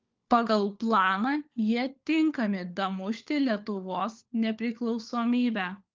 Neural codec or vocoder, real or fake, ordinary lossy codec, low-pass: codec, 16 kHz, 4 kbps, FunCodec, trained on LibriTTS, 50 frames a second; fake; Opus, 32 kbps; 7.2 kHz